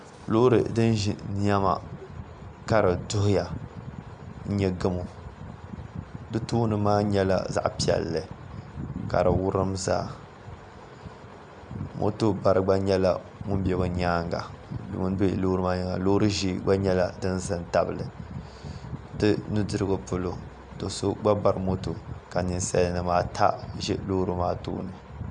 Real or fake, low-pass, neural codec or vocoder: real; 9.9 kHz; none